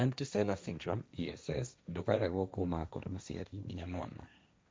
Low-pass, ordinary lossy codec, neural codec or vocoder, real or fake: none; none; codec, 16 kHz, 1.1 kbps, Voila-Tokenizer; fake